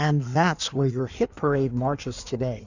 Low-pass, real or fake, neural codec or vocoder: 7.2 kHz; fake; codec, 16 kHz in and 24 kHz out, 1.1 kbps, FireRedTTS-2 codec